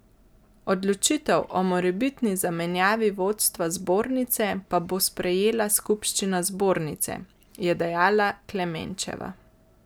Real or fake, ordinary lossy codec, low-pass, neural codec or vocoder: real; none; none; none